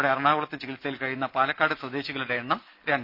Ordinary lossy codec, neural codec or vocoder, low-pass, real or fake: none; none; 5.4 kHz; real